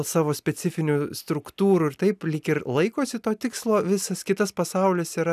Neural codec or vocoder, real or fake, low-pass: none; real; 14.4 kHz